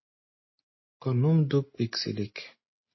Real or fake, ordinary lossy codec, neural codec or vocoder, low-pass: real; MP3, 24 kbps; none; 7.2 kHz